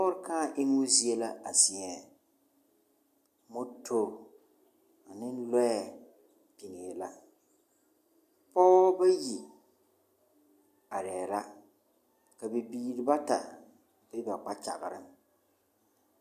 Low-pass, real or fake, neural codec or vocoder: 14.4 kHz; real; none